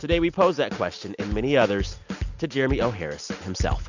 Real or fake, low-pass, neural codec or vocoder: real; 7.2 kHz; none